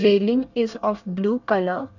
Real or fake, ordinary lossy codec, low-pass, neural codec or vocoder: fake; none; 7.2 kHz; codec, 24 kHz, 1 kbps, SNAC